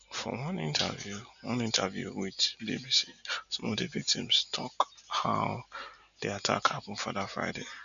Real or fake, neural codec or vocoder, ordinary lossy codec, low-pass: real; none; none; 7.2 kHz